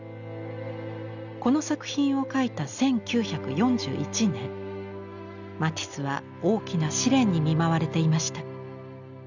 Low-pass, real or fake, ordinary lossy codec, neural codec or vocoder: 7.2 kHz; real; none; none